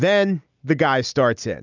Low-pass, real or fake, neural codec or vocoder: 7.2 kHz; real; none